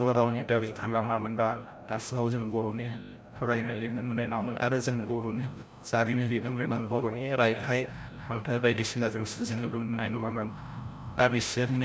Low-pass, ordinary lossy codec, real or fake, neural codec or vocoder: none; none; fake; codec, 16 kHz, 0.5 kbps, FreqCodec, larger model